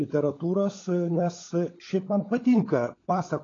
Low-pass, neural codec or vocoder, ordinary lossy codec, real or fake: 7.2 kHz; codec, 16 kHz, 16 kbps, FunCodec, trained on LibriTTS, 50 frames a second; AAC, 32 kbps; fake